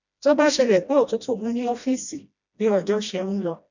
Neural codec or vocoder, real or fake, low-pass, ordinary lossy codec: codec, 16 kHz, 1 kbps, FreqCodec, smaller model; fake; 7.2 kHz; none